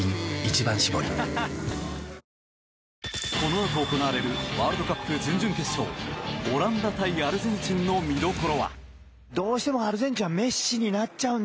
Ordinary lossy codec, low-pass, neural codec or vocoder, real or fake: none; none; none; real